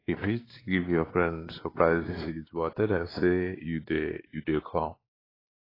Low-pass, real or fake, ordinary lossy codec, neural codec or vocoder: 5.4 kHz; fake; AAC, 24 kbps; codec, 16 kHz, 2 kbps, X-Codec, WavLM features, trained on Multilingual LibriSpeech